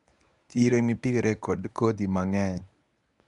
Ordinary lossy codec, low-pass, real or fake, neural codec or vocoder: none; 10.8 kHz; fake; codec, 24 kHz, 0.9 kbps, WavTokenizer, medium speech release version 1